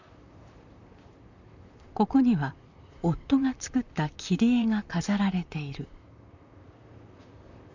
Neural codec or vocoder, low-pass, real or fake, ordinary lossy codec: vocoder, 44.1 kHz, 128 mel bands, Pupu-Vocoder; 7.2 kHz; fake; none